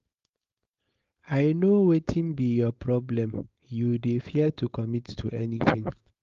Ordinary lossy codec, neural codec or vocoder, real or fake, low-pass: Opus, 32 kbps; codec, 16 kHz, 4.8 kbps, FACodec; fake; 7.2 kHz